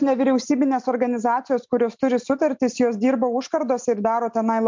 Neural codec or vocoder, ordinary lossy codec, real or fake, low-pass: none; MP3, 64 kbps; real; 7.2 kHz